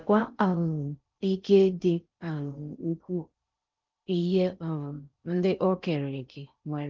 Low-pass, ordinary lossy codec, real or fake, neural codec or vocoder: 7.2 kHz; Opus, 32 kbps; fake; codec, 16 kHz in and 24 kHz out, 0.6 kbps, FocalCodec, streaming, 4096 codes